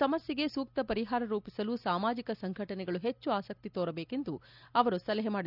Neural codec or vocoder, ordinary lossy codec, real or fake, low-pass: none; none; real; 5.4 kHz